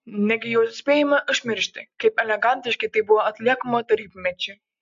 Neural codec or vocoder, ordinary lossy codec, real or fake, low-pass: none; AAC, 64 kbps; real; 7.2 kHz